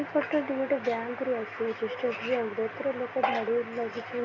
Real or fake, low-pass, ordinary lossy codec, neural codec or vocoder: real; 7.2 kHz; none; none